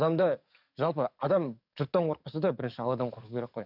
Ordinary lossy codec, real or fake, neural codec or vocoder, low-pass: none; fake; codec, 44.1 kHz, 7.8 kbps, DAC; 5.4 kHz